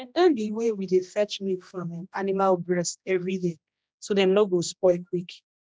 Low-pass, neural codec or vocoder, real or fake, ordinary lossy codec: none; codec, 16 kHz, 1 kbps, X-Codec, HuBERT features, trained on general audio; fake; none